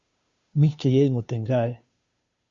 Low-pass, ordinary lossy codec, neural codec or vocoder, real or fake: 7.2 kHz; Opus, 64 kbps; codec, 16 kHz, 2 kbps, FunCodec, trained on Chinese and English, 25 frames a second; fake